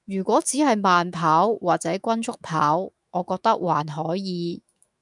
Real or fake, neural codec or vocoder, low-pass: fake; autoencoder, 48 kHz, 128 numbers a frame, DAC-VAE, trained on Japanese speech; 10.8 kHz